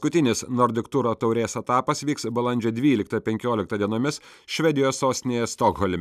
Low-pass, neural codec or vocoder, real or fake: 14.4 kHz; none; real